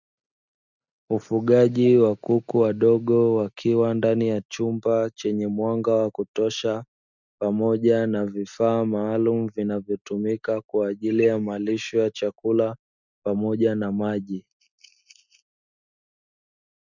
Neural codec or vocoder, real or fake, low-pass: none; real; 7.2 kHz